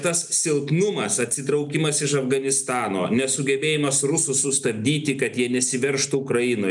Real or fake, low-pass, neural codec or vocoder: real; 10.8 kHz; none